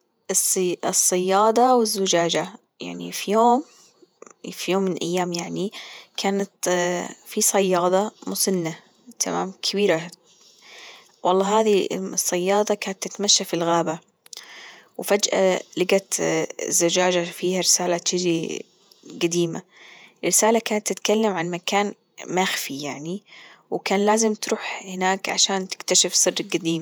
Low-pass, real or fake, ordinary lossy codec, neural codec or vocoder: none; fake; none; vocoder, 48 kHz, 128 mel bands, Vocos